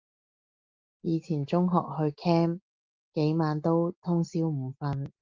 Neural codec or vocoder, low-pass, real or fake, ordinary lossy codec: none; 7.2 kHz; real; Opus, 32 kbps